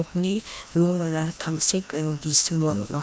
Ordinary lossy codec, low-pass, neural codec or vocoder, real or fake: none; none; codec, 16 kHz, 1 kbps, FreqCodec, larger model; fake